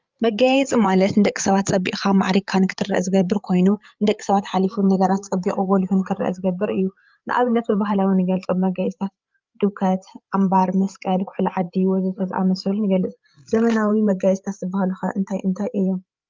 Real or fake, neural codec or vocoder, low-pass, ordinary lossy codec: fake; codec, 16 kHz, 8 kbps, FreqCodec, larger model; 7.2 kHz; Opus, 24 kbps